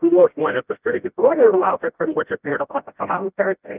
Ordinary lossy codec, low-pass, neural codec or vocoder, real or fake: Opus, 32 kbps; 3.6 kHz; codec, 16 kHz, 0.5 kbps, FreqCodec, smaller model; fake